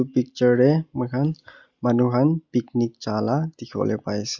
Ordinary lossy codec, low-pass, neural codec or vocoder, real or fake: Opus, 64 kbps; 7.2 kHz; none; real